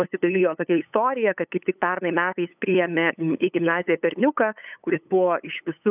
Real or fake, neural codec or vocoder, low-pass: fake; codec, 16 kHz, 8 kbps, FunCodec, trained on LibriTTS, 25 frames a second; 3.6 kHz